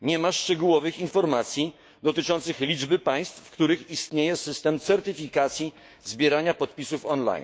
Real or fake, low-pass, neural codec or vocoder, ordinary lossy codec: fake; none; codec, 16 kHz, 6 kbps, DAC; none